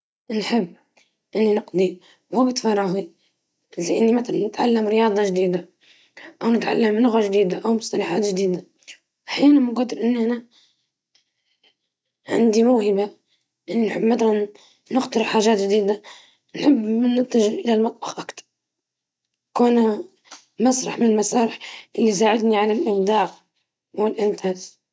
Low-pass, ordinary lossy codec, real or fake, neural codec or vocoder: none; none; real; none